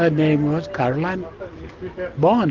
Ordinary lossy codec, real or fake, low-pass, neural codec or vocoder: Opus, 32 kbps; real; 7.2 kHz; none